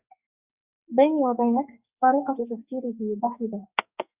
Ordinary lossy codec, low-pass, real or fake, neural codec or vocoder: Opus, 64 kbps; 3.6 kHz; fake; codec, 44.1 kHz, 2.6 kbps, SNAC